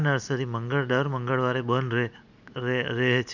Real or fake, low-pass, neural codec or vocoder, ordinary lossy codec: real; 7.2 kHz; none; none